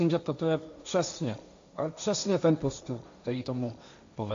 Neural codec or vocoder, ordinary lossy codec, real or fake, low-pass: codec, 16 kHz, 1.1 kbps, Voila-Tokenizer; AAC, 64 kbps; fake; 7.2 kHz